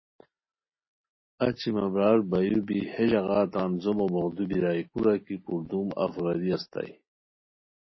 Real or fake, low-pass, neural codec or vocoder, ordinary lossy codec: real; 7.2 kHz; none; MP3, 24 kbps